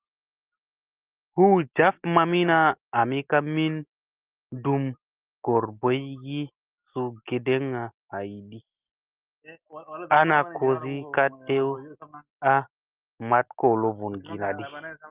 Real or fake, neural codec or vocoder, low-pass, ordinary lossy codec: real; none; 3.6 kHz; Opus, 24 kbps